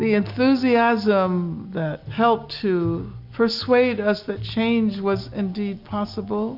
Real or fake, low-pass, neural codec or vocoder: real; 5.4 kHz; none